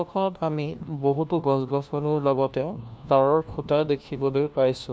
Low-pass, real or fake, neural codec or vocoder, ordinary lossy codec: none; fake; codec, 16 kHz, 1 kbps, FunCodec, trained on LibriTTS, 50 frames a second; none